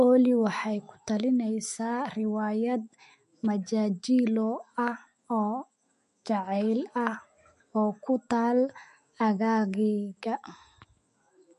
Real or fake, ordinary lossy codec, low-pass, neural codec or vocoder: fake; MP3, 48 kbps; 14.4 kHz; autoencoder, 48 kHz, 128 numbers a frame, DAC-VAE, trained on Japanese speech